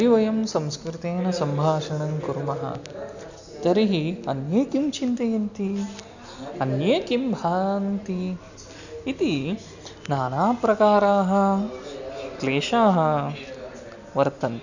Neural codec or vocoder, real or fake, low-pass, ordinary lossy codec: none; real; 7.2 kHz; none